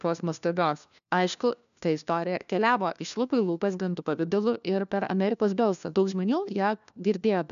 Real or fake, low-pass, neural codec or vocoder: fake; 7.2 kHz; codec, 16 kHz, 1 kbps, FunCodec, trained on LibriTTS, 50 frames a second